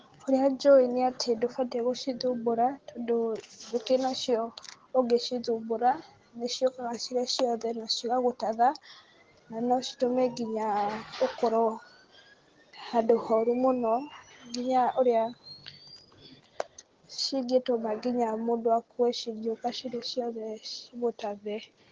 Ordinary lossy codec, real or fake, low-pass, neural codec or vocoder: Opus, 16 kbps; real; 7.2 kHz; none